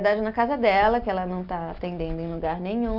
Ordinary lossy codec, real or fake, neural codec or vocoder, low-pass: none; real; none; 5.4 kHz